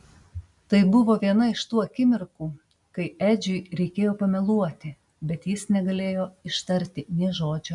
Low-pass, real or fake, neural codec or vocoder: 10.8 kHz; real; none